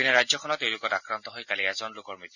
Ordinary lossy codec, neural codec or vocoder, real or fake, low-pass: none; none; real; 7.2 kHz